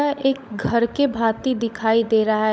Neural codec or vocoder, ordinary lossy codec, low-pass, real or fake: codec, 16 kHz, 16 kbps, FunCodec, trained on LibriTTS, 50 frames a second; none; none; fake